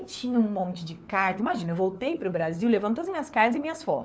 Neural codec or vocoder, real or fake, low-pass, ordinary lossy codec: codec, 16 kHz, 4 kbps, FunCodec, trained on LibriTTS, 50 frames a second; fake; none; none